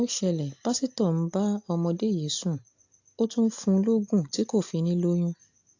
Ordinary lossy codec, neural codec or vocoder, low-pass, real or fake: AAC, 48 kbps; none; 7.2 kHz; real